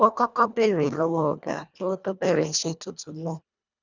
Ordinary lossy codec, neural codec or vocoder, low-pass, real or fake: none; codec, 24 kHz, 1.5 kbps, HILCodec; 7.2 kHz; fake